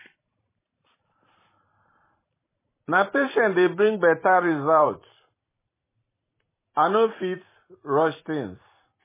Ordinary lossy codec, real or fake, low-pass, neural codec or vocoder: MP3, 16 kbps; fake; 3.6 kHz; vocoder, 44.1 kHz, 128 mel bands every 256 samples, BigVGAN v2